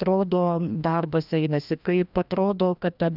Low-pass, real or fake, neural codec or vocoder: 5.4 kHz; fake; codec, 16 kHz, 1 kbps, FreqCodec, larger model